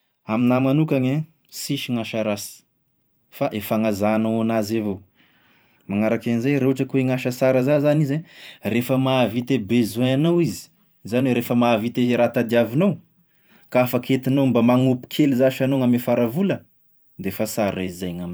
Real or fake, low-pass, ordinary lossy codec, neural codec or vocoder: fake; none; none; vocoder, 44.1 kHz, 128 mel bands every 512 samples, BigVGAN v2